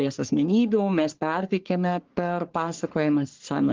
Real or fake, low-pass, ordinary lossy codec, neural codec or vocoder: fake; 7.2 kHz; Opus, 16 kbps; codec, 44.1 kHz, 3.4 kbps, Pupu-Codec